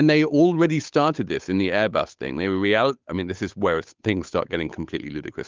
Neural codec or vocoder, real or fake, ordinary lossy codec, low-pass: codec, 16 kHz, 8 kbps, FunCodec, trained on Chinese and English, 25 frames a second; fake; Opus, 32 kbps; 7.2 kHz